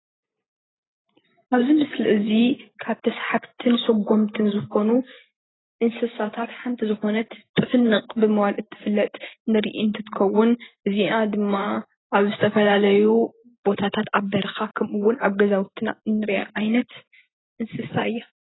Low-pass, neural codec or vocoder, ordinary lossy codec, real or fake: 7.2 kHz; none; AAC, 16 kbps; real